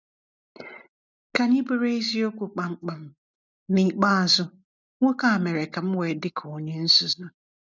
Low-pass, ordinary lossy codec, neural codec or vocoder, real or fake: 7.2 kHz; none; none; real